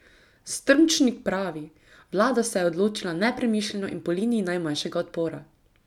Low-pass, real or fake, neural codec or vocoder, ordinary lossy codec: 19.8 kHz; real; none; none